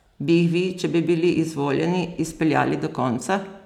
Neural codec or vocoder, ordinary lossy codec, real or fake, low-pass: none; none; real; 19.8 kHz